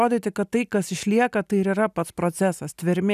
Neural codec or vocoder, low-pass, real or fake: none; 14.4 kHz; real